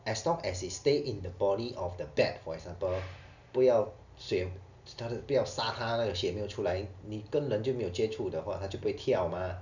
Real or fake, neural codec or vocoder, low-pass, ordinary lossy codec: real; none; 7.2 kHz; none